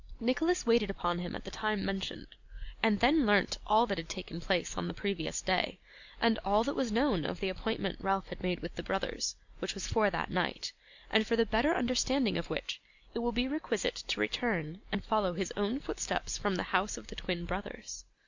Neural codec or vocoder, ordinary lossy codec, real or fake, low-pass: none; Opus, 64 kbps; real; 7.2 kHz